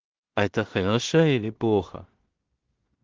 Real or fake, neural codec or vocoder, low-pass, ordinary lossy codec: fake; codec, 16 kHz in and 24 kHz out, 0.4 kbps, LongCat-Audio-Codec, two codebook decoder; 7.2 kHz; Opus, 16 kbps